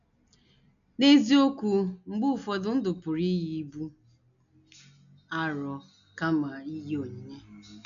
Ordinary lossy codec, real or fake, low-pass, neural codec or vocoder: none; real; 7.2 kHz; none